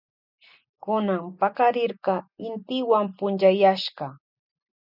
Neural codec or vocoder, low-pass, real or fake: none; 5.4 kHz; real